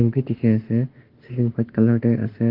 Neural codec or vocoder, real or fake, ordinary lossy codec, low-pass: autoencoder, 48 kHz, 32 numbers a frame, DAC-VAE, trained on Japanese speech; fake; Opus, 16 kbps; 5.4 kHz